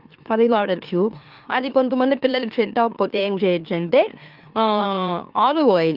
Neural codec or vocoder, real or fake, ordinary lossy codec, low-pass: autoencoder, 44.1 kHz, a latent of 192 numbers a frame, MeloTTS; fake; Opus, 32 kbps; 5.4 kHz